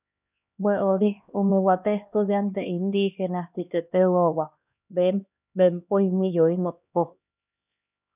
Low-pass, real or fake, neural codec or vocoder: 3.6 kHz; fake; codec, 16 kHz, 1 kbps, X-Codec, HuBERT features, trained on LibriSpeech